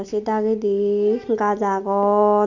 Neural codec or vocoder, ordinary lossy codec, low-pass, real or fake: none; none; 7.2 kHz; real